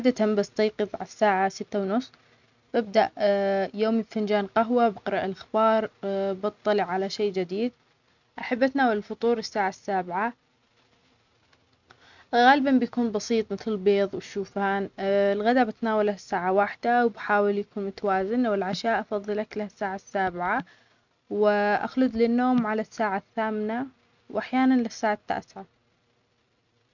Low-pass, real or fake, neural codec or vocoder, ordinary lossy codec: 7.2 kHz; real; none; none